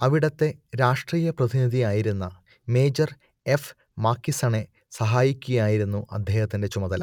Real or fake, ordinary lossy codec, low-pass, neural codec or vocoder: real; none; 19.8 kHz; none